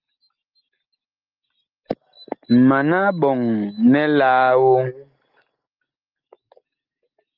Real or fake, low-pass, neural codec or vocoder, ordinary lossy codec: real; 5.4 kHz; none; Opus, 24 kbps